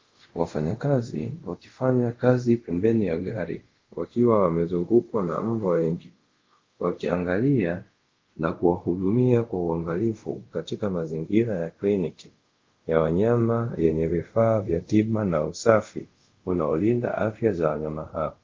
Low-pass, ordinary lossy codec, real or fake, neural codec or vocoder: 7.2 kHz; Opus, 32 kbps; fake; codec, 24 kHz, 0.5 kbps, DualCodec